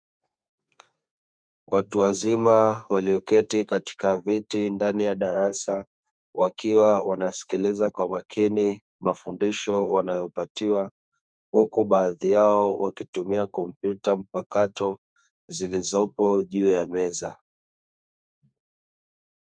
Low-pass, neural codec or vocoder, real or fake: 9.9 kHz; codec, 32 kHz, 1.9 kbps, SNAC; fake